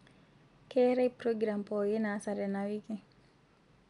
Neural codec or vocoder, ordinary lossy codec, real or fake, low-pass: none; none; real; 10.8 kHz